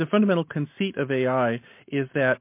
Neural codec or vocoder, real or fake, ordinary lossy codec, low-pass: none; real; MP3, 24 kbps; 3.6 kHz